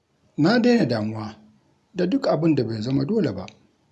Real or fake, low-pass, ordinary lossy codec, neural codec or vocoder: real; none; none; none